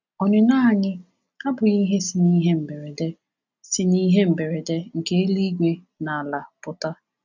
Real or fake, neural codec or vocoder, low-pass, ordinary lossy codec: real; none; 7.2 kHz; none